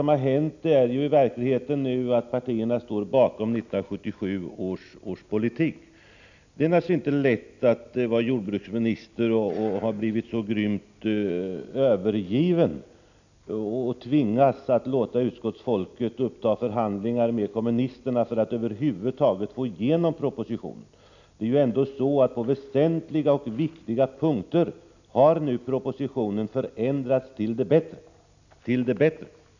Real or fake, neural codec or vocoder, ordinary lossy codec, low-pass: real; none; none; 7.2 kHz